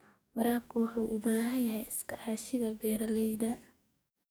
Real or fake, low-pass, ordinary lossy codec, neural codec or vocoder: fake; none; none; codec, 44.1 kHz, 2.6 kbps, DAC